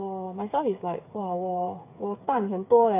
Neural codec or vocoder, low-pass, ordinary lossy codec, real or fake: codec, 16 kHz, 8 kbps, FreqCodec, smaller model; 3.6 kHz; AAC, 24 kbps; fake